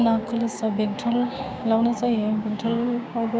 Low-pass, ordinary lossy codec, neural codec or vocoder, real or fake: none; none; codec, 16 kHz, 6 kbps, DAC; fake